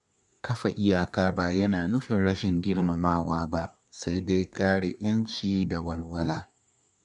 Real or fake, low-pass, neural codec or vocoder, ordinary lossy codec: fake; 10.8 kHz; codec, 24 kHz, 1 kbps, SNAC; none